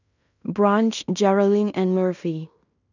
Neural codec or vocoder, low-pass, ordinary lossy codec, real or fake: codec, 16 kHz in and 24 kHz out, 0.9 kbps, LongCat-Audio-Codec, fine tuned four codebook decoder; 7.2 kHz; none; fake